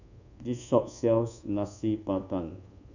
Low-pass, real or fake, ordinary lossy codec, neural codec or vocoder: 7.2 kHz; fake; none; codec, 24 kHz, 1.2 kbps, DualCodec